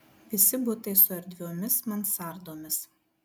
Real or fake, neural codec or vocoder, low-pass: real; none; 19.8 kHz